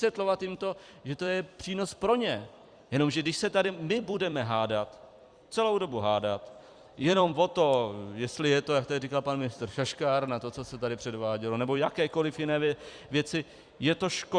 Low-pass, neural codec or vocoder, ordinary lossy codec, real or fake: 9.9 kHz; vocoder, 44.1 kHz, 128 mel bands every 256 samples, BigVGAN v2; Opus, 64 kbps; fake